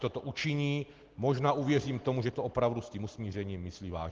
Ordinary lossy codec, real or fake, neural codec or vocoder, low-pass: Opus, 16 kbps; real; none; 7.2 kHz